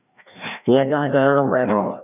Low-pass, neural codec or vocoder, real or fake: 3.6 kHz; codec, 16 kHz, 1 kbps, FreqCodec, larger model; fake